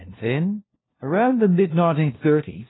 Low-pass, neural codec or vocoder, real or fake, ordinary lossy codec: 7.2 kHz; codec, 16 kHz, 1 kbps, FunCodec, trained on LibriTTS, 50 frames a second; fake; AAC, 16 kbps